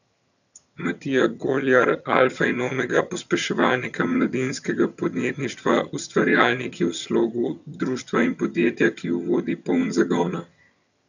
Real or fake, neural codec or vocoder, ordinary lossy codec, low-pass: fake; vocoder, 22.05 kHz, 80 mel bands, HiFi-GAN; none; 7.2 kHz